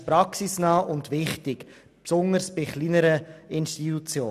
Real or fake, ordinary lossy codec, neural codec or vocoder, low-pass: real; none; none; 14.4 kHz